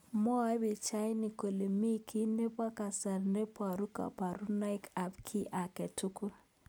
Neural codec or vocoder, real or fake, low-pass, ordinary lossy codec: none; real; none; none